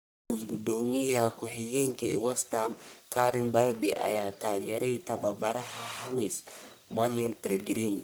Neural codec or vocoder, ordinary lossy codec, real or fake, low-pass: codec, 44.1 kHz, 1.7 kbps, Pupu-Codec; none; fake; none